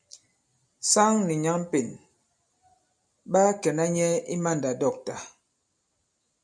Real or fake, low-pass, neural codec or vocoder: real; 9.9 kHz; none